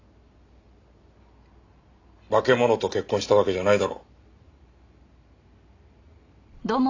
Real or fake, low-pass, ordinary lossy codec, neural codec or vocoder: real; 7.2 kHz; AAC, 48 kbps; none